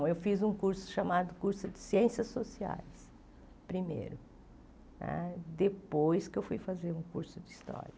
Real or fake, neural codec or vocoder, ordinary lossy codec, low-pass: real; none; none; none